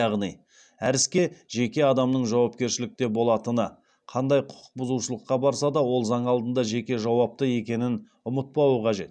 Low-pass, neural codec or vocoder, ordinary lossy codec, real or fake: 9.9 kHz; none; none; real